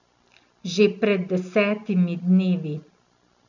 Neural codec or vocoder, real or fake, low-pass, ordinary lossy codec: none; real; 7.2 kHz; none